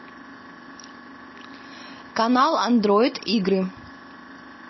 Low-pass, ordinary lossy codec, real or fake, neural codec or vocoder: 7.2 kHz; MP3, 24 kbps; real; none